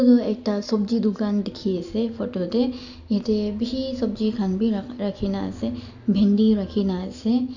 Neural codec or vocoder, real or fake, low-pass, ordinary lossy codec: none; real; 7.2 kHz; none